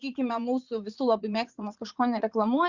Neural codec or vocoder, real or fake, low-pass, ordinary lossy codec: vocoder, 44.1 kHz, 80 mel bands, Vocos; fake; 7.2 kHz; Opus, 64 kbps